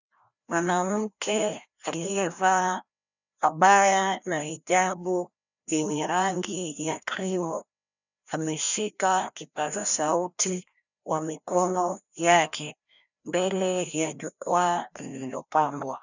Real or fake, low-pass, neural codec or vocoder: fake; 7.2 kHz; codec, 16 kHz, 1 kbps, FreqCodec, larger model